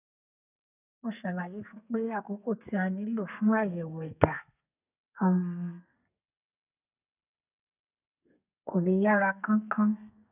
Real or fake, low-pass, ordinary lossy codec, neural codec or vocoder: fake; 3.6 kHz; none; codec, 32 kHz, 1.9 kbps, SNAC